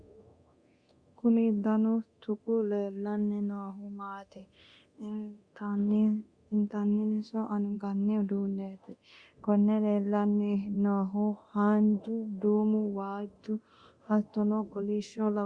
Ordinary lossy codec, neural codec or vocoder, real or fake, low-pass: Opus, 64 kbps; codec, 24 kHz, 0.9 kbps, DualCodec; fake; 9.9 kHz